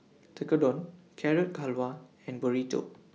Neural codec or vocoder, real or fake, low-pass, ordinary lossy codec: none; real; none; none